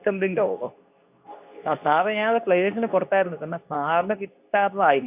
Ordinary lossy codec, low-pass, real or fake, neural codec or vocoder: AAC, 32 kbps; 3.6 kHz; fake; codec, 24 kHz, 0.9 kbps, WavTokenizer, medium speech release version 2